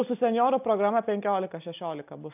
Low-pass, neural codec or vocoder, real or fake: 3.6 kHz; none; real